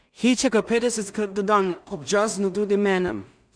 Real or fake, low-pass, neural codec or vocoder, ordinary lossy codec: fake; 9.9 kHz; codec, 16 kHz in and 24 kHz out, 0.4 kbps, LongCat-Audio-Codec, two codebook decoder; none